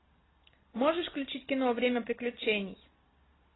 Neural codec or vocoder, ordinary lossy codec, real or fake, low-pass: none; AAC, 16 kbps; real; 7.2 kHz